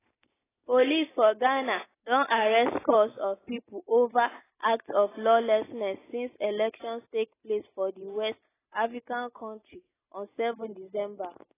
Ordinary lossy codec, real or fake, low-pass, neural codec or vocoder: AAC, 16 kbps; real; 3.6 kHz; none